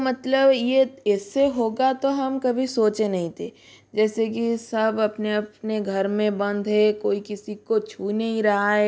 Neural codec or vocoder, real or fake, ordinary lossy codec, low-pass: none; real; none; none